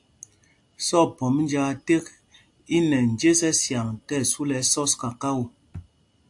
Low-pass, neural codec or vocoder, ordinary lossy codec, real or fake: 10.8 kHz; none; AAC, 64 kbps; real